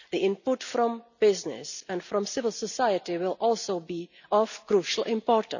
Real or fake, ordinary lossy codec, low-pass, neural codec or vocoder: real; none; 7.2 kHz; none